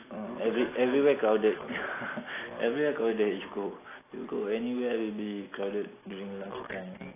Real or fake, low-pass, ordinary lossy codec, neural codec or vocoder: real; 3.6 kHz; AAC, 16 kbps; none